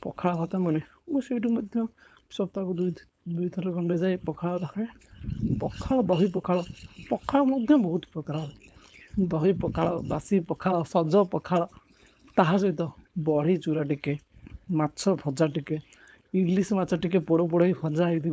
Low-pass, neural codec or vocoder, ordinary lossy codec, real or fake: none; codec, 16 kHz, 4.8 kbps, FACodec; none; fake